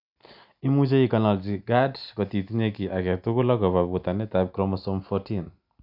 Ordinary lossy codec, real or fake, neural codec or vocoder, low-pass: none; real; none; 5.4 kHz